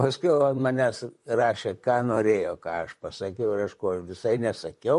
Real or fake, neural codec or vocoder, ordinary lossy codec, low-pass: fake; vocoder, 44.1 kHz, 128 mel bands, Pupu-Vocoder; MP3, 48 kbps; 14.4 kHz